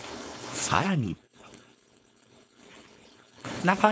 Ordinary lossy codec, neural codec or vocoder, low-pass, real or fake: none; codec, 16 kHz, 4.8 kbps, FACodec; none; fake